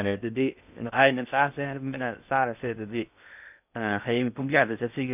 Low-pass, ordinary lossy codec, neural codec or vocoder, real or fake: 3.6 kHz; none; codec, 16 kHz in and 24 kHz out, 0.6 kbps, FocalCodec, streaming, 2048 codes; fake